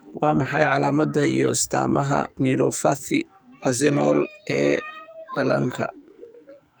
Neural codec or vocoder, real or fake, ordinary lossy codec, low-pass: codec, 44.1 kHz, 2.6 kbps, SNAC; fake; none; none